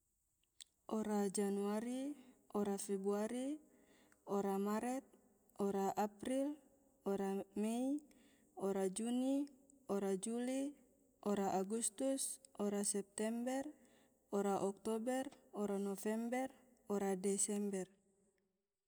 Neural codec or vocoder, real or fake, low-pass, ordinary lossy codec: none; real; none; none